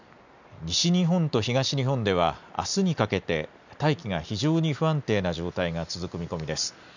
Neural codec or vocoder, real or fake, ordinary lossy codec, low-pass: none; real; none; 7.2 kHz